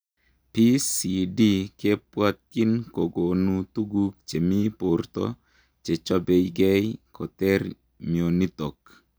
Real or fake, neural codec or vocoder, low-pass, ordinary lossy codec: real; none; none; none